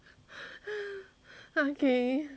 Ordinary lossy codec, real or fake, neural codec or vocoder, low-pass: none; real; none; none